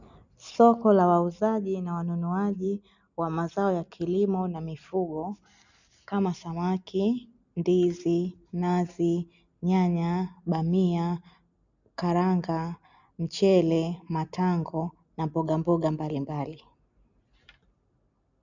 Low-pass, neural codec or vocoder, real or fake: 7.2 kHz; none; real